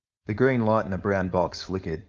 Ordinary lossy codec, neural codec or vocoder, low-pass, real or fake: Opus, 24 kbps; codec, 16 kHz, 4.8 kbps, FACodec; 7.2 kHz; fake